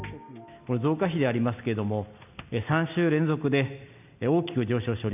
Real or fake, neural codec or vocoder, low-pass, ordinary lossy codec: real; none; 3.6 kHz; none